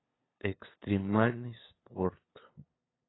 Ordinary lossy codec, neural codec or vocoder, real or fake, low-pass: AAC, 16 kbps; codec, 16 kHz, 2 kbps, FunCodec, trained on LibriTTS, 25 frames a second; fake; 7.2 kHz